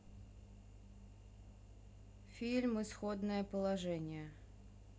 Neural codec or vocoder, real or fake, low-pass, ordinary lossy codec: none; real; none; none